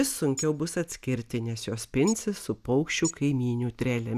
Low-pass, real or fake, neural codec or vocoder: 14.4 kHz; real; none